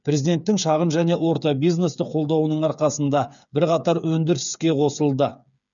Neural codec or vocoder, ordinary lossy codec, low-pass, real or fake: codec, 16 kHz, 8 kbps, FreqCodec, smaller model; none; 7.2 kHz; fake